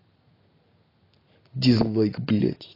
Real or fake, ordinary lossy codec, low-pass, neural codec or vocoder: real; AAC, 24 kbps; 5.4 kHz; none